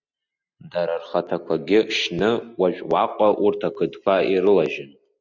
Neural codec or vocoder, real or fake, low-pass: none; real; 7.2 kHz